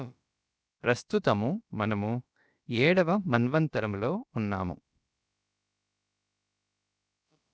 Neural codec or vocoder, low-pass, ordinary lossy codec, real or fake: codec, 16 kHz, about 1 kbps, DyCAST, with the encoder's durations; none; none; fake